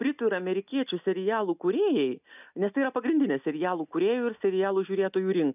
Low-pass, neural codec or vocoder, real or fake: 3.6 kHz; none; real